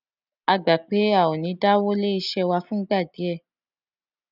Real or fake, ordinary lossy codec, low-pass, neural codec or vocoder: real; none; 5.4 kHz; none